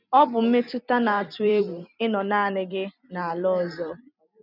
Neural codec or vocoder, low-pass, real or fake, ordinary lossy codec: vocoder, 44.1 kHz, 128 mel bands every 256 samples, BigVGAN v2; 5.4 kHz; fake; none